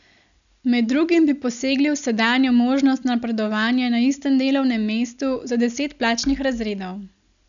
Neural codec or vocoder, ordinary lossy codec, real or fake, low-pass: none; none; real; 7.2 kHz